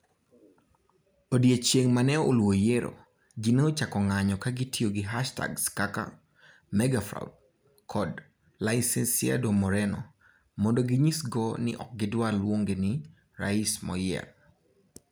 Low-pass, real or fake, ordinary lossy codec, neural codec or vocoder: none; real; none; none